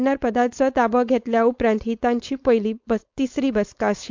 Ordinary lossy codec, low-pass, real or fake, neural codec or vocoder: MP3, 64 kbps; 7.2 kHz; fake; codec, 16 kHz, 4.8 kbps, FACodec